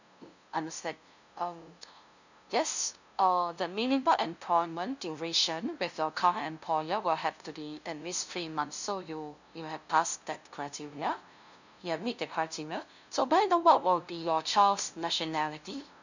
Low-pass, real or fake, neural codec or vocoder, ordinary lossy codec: 7.2 kHz; fake; codec, 16 kHz, 0.5 kbps, FunCodec, trained on LibriTTS, 25 frames a second; none